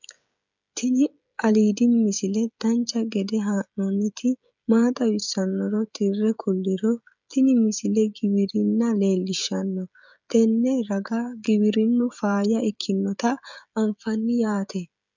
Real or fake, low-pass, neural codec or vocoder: fake; 7.2 kHz; codec, 16 kHz, 16 kbps, FreqCodec, smaller model